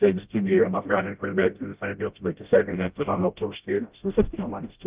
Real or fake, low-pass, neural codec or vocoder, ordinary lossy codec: fake; 3.6 kHz; codec, 16 kHz, 0.5 kbps, FreqCodec, smaller model; Opus, 32 kbps